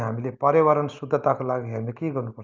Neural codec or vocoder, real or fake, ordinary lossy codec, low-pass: none; real; Opus, 24 kbps; 7.2 kHz